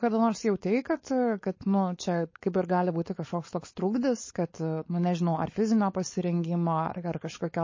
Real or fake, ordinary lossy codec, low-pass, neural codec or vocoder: fake; MP3, 32 kbps; 7.2 kHz; codec, 16 kHz, 4.8 kbps, FACodec